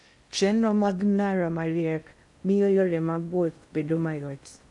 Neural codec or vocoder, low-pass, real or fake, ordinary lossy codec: codec, 16 kHz in and 24 kHz out, 0.6 kbps, FocalCodec, streaming, 2048 codes; 10.8 kHz; fake; AAC, 64 kbps